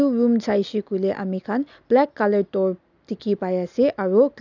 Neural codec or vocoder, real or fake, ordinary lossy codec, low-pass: none; real; none; 7.2 kHz